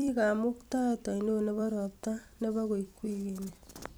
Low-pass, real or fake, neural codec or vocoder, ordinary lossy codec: none; fake; vocoder, 44.1 kHz, 128 mel bands every 256 samples, BigVGAN v2; none